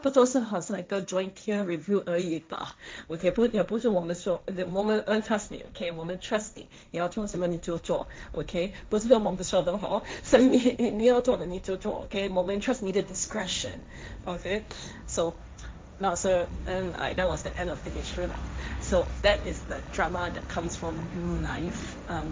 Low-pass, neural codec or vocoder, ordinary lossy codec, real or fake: none; codec, 16 kHz, 1.1 kbps, Voila-Tokenizer; none; fake